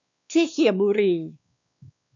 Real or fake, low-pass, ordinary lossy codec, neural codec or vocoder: fake; 7.2 kHz; MP3, 64 kbps; codec, 16 kHz, 2 kbps, X-Codec, WavLM features, trained on Multilingual LibriSpeech